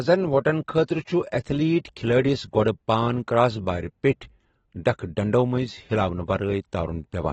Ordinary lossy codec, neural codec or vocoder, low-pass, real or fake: AAC, 24 kbps; none; 19.8 kHz; real